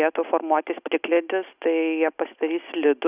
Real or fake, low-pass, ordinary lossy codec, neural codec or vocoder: real; 3.6 kHz; Opus, 64 kbps; none